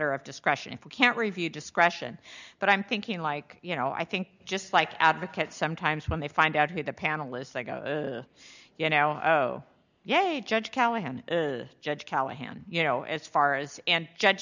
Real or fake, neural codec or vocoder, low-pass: real; none; 7.2 kHz